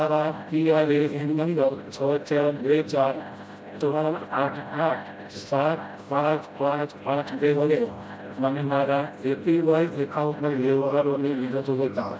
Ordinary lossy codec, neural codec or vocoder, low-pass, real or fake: none; codec, 16 kHz, 0.5 kbps, FreqCodec, smaller model; none; fake